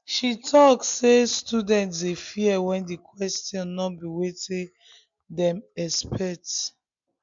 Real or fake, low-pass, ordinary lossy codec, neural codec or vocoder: real; 7.2 kHz; MP3, 64 kbps; none